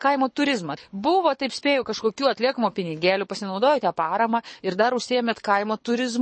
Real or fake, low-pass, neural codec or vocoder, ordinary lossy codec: fake; 9.9 kHz; codec, 24 kHz, 6 kbps, HILCodec; MP3, 32 kbps